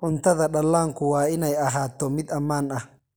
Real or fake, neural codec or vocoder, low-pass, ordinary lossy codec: real; none; none; none